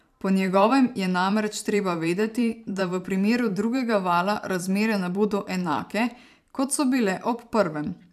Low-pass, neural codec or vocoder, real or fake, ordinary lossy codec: 14.4 kHz; vocoder, 44.1 kHz, 128 mel bands every 512 samples, BigVGAN v2; fake; AAC, 96 kbps